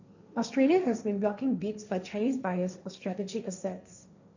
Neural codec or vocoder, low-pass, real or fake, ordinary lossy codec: codec, 16 kHz, 1.1 kbps, Voila-Tokenizer; 7.2 kHz; fake; none